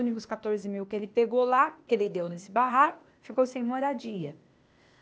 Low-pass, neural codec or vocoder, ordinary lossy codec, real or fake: none; codec, 16 kHz, 0.8 kbps, ZipCodec; none; fake